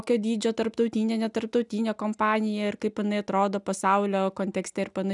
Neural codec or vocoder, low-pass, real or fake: none; 10.8 kHz; real